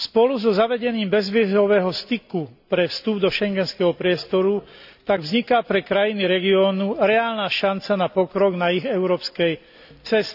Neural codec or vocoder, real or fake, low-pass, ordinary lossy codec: none; real; 5.4 kHz; none